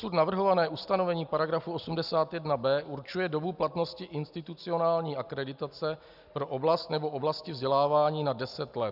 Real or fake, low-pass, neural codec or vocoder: real; 5.4 kHz; none